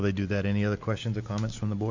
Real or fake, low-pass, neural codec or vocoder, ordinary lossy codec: real; 7.2 kHz; none; MP3, 64 kbps